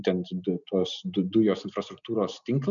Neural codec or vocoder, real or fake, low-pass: none; real; 7.2 kHz